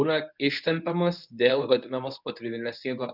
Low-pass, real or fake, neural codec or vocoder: 5.4 kHz; fake; codec, 24 kHz, 0.9 kbps, WavTokenizer, medium speech release version 1